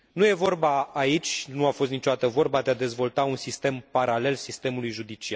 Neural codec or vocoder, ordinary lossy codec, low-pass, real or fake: none; none; none; real